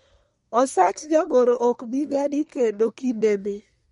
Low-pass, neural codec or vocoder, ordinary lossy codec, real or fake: 10.8 kHz; codec, 24 kHz, 1 kbps, SNAC; MP3, 48 kbps; fake